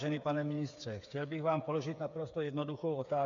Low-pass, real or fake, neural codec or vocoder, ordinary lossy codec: 7.2 kHz; fake; codec, 16 kHz, 8 kbps, FreqCodec, smaller model; MP3, 64 kbps